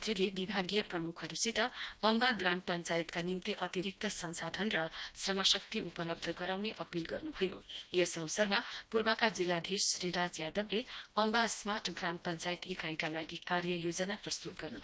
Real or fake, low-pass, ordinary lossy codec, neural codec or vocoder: fake; none; none; codec, 16 kHz, 1 kbps, FreqCodec, smaller model